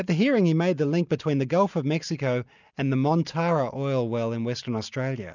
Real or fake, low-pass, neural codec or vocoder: real; 7.2 kHz; none